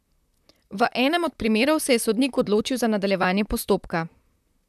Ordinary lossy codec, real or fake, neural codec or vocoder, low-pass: none; fake; vocoder, 44.1 kHz, 128 mel bands, Pupu-Vocoder; 14.4 kHz